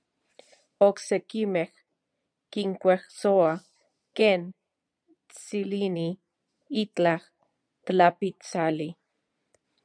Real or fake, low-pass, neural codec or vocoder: fake; 9.9 kHz; vocoder, 22.05 kHz, 80 mel bands, Vocos